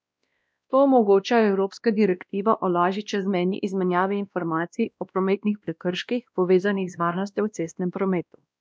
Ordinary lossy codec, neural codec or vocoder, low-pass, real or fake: none; codec, 16 kHz, 1 kbps, X-Codec, WavLM features, trained on Multilingual LibriSpeech; none; fake